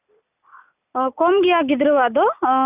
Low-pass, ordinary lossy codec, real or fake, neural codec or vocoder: 3.6 kHz; none; real; none